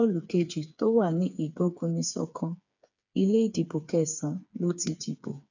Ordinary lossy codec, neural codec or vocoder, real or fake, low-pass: none; codec, 16 kHz, 4 kbps, FreqCodec, smaller model; fake; 7.2 kHz